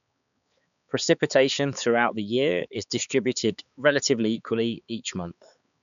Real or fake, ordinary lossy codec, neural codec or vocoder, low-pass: fake; MP3, 96 kbps; codec, 16 kHz, 4 kbps, X-Codec, HuBERT features, trained on balanced general audio; 7.2 kHz